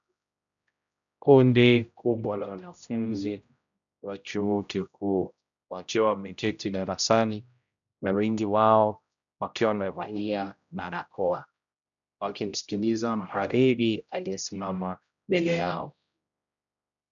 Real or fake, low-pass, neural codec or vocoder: fake; 7.2 kHz; codec, 16 kHz, 0.5 kbps, X-Codec, HuBERT features, trained on general audio